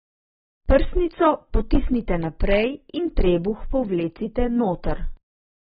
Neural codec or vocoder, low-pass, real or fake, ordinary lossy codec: none; 19.8 kHz; real; AAC, 16 kbps